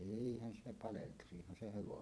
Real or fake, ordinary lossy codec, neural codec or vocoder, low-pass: fake; none; vocoder, 22.05 kHz, 80 mel bands, Vocos; none